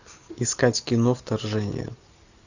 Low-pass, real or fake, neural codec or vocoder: 7.2 kHz; real; none